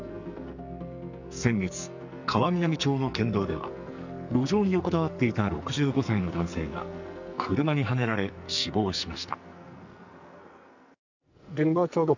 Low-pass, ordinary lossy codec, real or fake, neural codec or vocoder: 7.2 kHz; none; fake; codec, 44.1 kHz, 2.6 kbps, SNAC